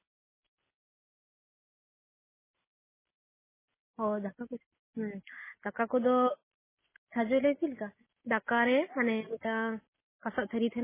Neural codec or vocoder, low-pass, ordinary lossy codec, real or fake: none; 3.6 kHz; MP3, 16 kbps; real